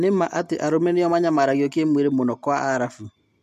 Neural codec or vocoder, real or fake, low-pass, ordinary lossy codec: none; real; 14.4 kHz; MP3, 64 kbps